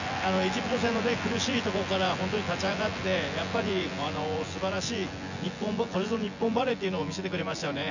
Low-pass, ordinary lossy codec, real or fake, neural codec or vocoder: 7.2 kHz; none; fake; vocoder, 24 kHz, 100 mel bands, Vocos